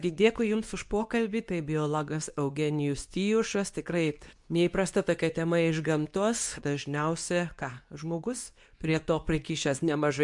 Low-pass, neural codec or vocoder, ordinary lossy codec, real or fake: 10.8 kHz; codec, 24 kHz, 0.9 kbps, WavTokenizer, medium speech release version 2; MP3, 64 kbps; fake